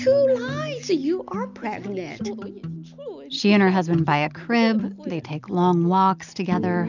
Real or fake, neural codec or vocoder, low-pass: real; none; 7.2 kHz